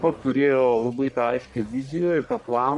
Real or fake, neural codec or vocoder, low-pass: fake; codec, 44.1 kHz, 1.7 kbps, Pupu-Codec; 10.8 kHz